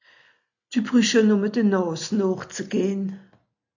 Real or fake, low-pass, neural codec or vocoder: real; 7.2 kHz; none